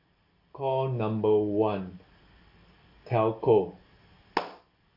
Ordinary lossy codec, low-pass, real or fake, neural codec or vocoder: AAC, 32 kbps; 5.4 kHz; real; none